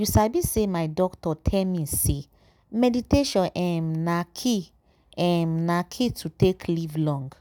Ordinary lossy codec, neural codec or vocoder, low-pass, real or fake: none; none; none; real